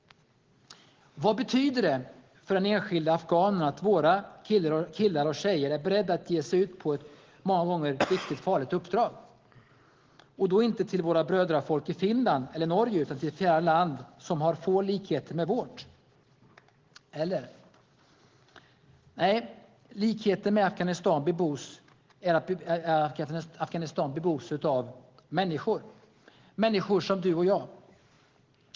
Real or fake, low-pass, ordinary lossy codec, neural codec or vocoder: real; 7.2 kHz; Opus, 16 kbps; none